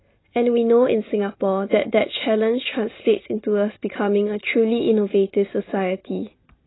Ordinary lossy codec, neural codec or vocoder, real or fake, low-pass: AAC, 16 kbps; none; real; 7.2 kHz